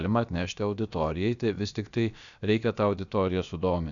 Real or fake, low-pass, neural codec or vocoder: fake; 7.2 kHz; codec, 16 kHz, about 1 kbps, DyCAST, with the encoder's durations